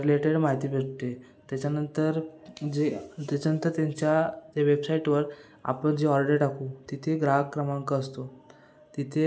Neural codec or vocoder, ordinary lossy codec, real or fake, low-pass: none; none; real; none